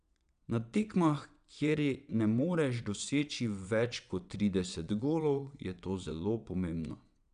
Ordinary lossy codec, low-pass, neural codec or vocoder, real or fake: none; 9.9 kHz; vocoder, 22.05 kHz, 80 mel bands, WaveNeXt; fake